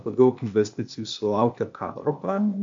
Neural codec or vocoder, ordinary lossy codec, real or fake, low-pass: codec, 16 kHz, 0.8 kbps, ZipCodec; MP3, 48 kbps; fake; 7.2 kHz